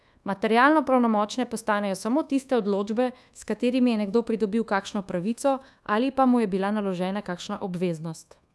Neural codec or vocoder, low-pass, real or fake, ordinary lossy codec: codec, 24 kHz, 1.2 kbps, DualCodec; none; fake; none